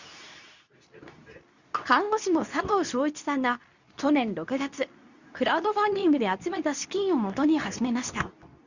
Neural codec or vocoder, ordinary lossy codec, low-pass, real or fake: codec, 24 kHz, 0.9 kbps, WavTokenizer, medium speech release version 2; Opus, 64 kbps; 7.2 kHz; fake